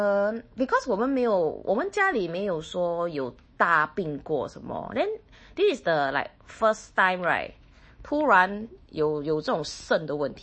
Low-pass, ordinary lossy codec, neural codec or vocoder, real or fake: 9.9 kHz; MP3, 32 kbps; autoencoder, 48 kHz, 128 numbers a frame, DAC-VAE, trained on Japanese speech; fake